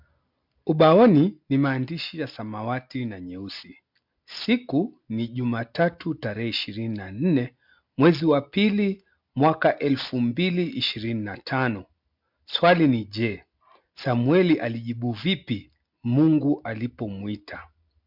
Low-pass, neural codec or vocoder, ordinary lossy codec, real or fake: 5.4 kHz; none; MP3, 48 kbps; real